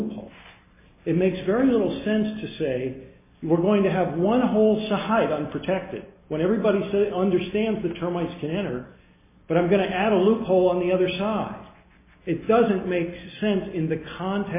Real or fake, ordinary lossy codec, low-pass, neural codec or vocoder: real; MP3, 16 kbps; 3.6 kHz; none